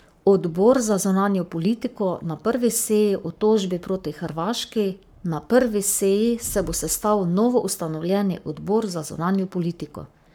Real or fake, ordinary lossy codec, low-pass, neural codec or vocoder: fake; none; none; codec, 44.1 kHz, 7.8 kbps, Pupu-Codec